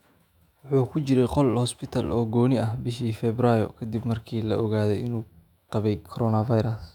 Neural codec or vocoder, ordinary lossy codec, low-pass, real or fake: autoencoder, 48 kHz, 128 numbers a frame, DAC-VAE, trained on Japanese speech; none; 19.8 kHz; fake